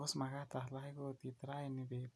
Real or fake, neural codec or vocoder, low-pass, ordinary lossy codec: real; none; none; none